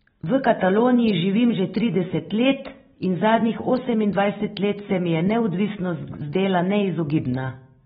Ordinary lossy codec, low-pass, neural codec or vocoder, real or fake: AAC, 16 kbps; 19.8 kHz; none; real